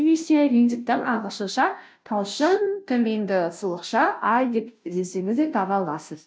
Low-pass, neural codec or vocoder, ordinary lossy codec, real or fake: none; codec, 16 kHz, 0.5 kbps, FunCodec, trained on Chinese and English, 25 frames a second; none; fake